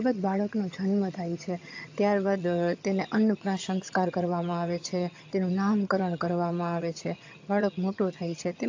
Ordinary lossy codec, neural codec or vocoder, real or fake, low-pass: AAC, 48 kbps; vocoder, 22.05 kHz, 80 mel bands, HiFi-GAN; fake; 7.2 kHz